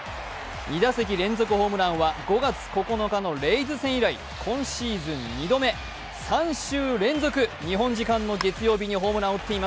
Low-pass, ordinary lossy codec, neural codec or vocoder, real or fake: none; none; none; real